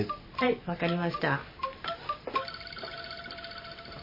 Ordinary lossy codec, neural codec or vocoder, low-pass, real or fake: MP3, 24 kbps; vocoder, 44.1 kHz, 128 mel bands every 512 samples, BigVGAN v2; 5.4 kHz; fake